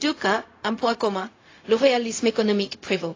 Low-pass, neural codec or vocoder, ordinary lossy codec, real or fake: 7.2 kHz; codec, 16 kHz, 0.4 kbps, LongCat-Audio-Codec; AAC, 32 kbps; fake